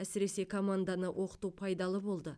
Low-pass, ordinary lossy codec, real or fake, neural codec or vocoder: none; none; real; none